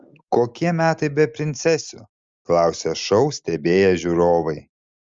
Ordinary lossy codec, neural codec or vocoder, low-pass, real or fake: Opus, 32 kbps; none; 7.2 kHz; real